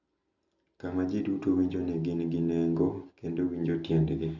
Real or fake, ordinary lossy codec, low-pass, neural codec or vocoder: real; none; 7.2 kHz; none